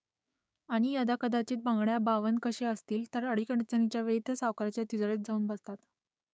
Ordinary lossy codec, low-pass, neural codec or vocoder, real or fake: none; none; codec, 16 kHz, 6 kbps, DAC; fake